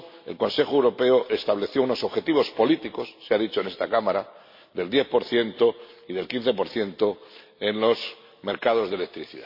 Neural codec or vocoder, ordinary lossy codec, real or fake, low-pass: none; MP3, 32 kbps; real; 5.4 kHz